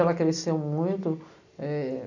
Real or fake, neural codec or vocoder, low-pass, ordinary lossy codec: real; none; 7.2 kHz; none